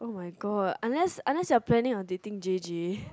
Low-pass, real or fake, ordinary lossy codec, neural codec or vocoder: none; real; none; none